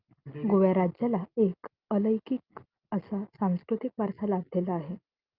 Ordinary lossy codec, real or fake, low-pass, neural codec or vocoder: Opus, 24 kbps; real; 5.4 kHz; none